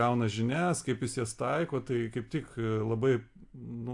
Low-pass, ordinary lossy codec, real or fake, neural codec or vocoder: 10.8 kHz; AAC, 64 kbps; real; none